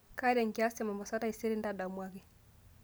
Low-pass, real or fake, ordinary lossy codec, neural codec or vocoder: none; real; none; none